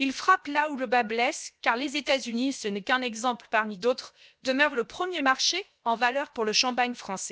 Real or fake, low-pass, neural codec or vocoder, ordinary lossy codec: fake; none; codec, 16 kHz, 0.7 kbps, FocalCodec; none